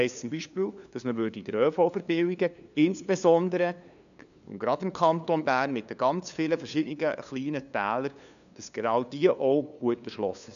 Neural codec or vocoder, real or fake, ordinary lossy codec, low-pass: codec, 16 kHz, 2 kbps, FunCodec, trained on LibriTTS, 25 frames a second; fake; none; 7.2 kHz